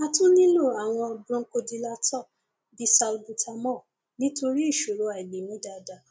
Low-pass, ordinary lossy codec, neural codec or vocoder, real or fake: none; none; none; real